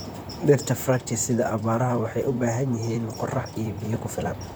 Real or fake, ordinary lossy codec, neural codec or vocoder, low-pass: fake; none; vocoder, 44.1 kHz, 128 mel bands, Pupu-Vocoder; none